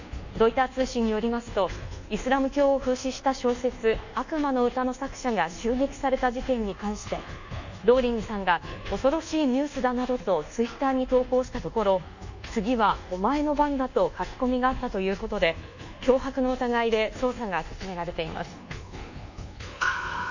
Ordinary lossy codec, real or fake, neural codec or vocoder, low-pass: none; fake; codec, 24 kHz, 1.2 kbps, DualCodec; 7.2 kHz